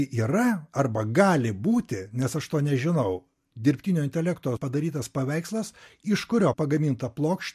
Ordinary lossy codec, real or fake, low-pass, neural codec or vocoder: MP3, 64 kbps; real; 14.4 kHz; none